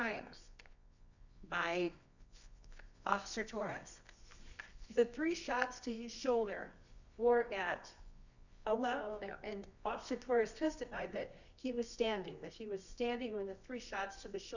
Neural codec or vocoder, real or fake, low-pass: codec, 24 kHz, 0.9 kbps, WavTokenizer, medium music audio release; fake; 7.2 kHz